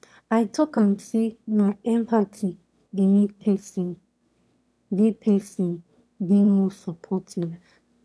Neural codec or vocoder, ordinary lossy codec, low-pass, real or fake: autoencoder, 22.05 kHz, a latent of 192 numbers a frame, VITS, trained on one speaker; none; none; fake